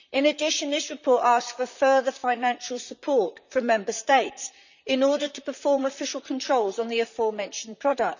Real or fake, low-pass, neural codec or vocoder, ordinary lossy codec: fake; 7.2 kHz; vocoder, 44.1 kHz, 128 mel bands, Pupu-Vocoder; none